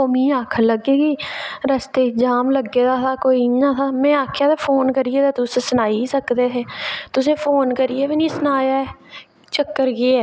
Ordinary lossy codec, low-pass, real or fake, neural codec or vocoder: none; none; real; none